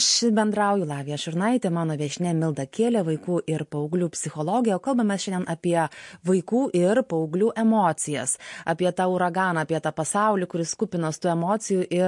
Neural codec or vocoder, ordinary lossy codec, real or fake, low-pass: none; MP3, 48 kbps; real; 10.8 kHz